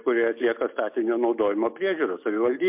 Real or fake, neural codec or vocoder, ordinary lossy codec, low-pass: real; none; MP3, 32 kbps; 3.6 kHz